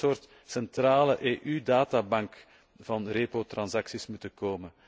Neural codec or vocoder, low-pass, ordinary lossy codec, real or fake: none; none; none; real